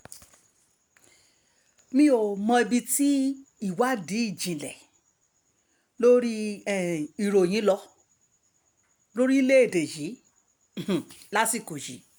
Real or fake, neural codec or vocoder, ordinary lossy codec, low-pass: real; none; none; none